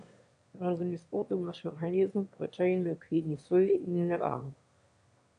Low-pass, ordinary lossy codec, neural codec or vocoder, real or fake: 9.9 kHz; AAC, 64 kbps; autoencoder, 22.05 kHz, a latent of 192 numbers a frame, VITS, trained on one speaker; fake